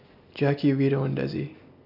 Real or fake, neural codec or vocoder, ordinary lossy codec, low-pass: real; none; AAC, 48 kbps; 5.4 kHz